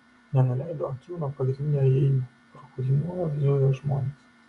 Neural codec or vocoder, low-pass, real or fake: vocoder, 24 kHz, 100 mel bands, Vocos; 10.8 kHz; fake